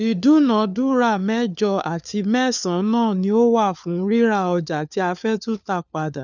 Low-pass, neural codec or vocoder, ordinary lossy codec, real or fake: 7.2 kHz; codec, 16 kHz, 4 kbps, FunCodec, trained on LibriTTS, 50 frames a second; none; fake